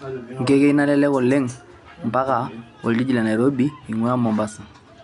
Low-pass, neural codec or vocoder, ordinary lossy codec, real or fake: 10.8 kHz; none; none; real